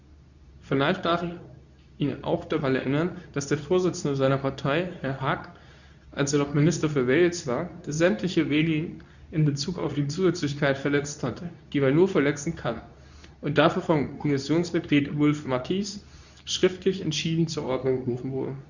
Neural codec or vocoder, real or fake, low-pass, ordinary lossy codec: codec, 24 kHz, 0.9 kbps, WavTokenizer, medium speech release version 2; fake; 7.2 kHz; none